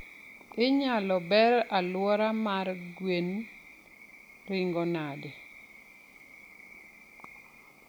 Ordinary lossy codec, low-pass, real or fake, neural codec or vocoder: none; none; real; none